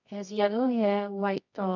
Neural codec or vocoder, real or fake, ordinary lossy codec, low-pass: codec, 24 kHz, 0.9 kbps, WavTokenizer, medium music audio release; fake; none; 7.2 kHz